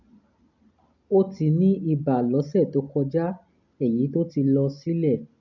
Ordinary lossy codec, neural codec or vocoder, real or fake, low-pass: none; none; real; 7.2 kHz